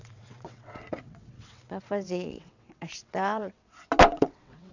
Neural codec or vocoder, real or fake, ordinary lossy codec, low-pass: vocoder, 22.05 kHz, 80 mel bands, WaveNeXt; fake; none; 7.2 kHz